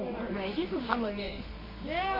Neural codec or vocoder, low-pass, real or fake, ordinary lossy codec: codec, 24 kHz, 0.9 kbps, WavTokenizer, medium music audio release; 5.4 kHz; fake; MP3, 24 kbps